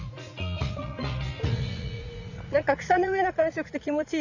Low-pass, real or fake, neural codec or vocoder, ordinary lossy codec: 7.2 kHz; fake; vocoder, 44.1 kHz, 80 mel bands, Vocos; none